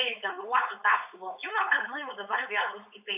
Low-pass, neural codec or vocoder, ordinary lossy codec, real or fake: 3.6 kHz; codec, 16 kHz, 4.8 kbps, FACodec; AAC, 32 kbps; fake